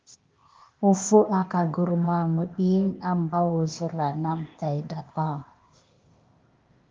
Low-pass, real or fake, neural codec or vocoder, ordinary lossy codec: 7.2 kHz; fake; codec, 16 kHz, 0.8 kbps, ZipCodec; Opus, 32 kbps